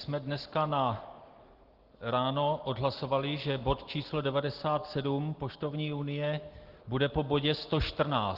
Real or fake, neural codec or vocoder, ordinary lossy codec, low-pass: real; none; Opus, 16 kbps; 5.4 kHz